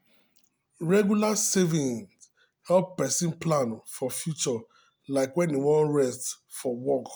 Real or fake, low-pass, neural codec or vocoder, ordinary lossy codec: real; none; none; none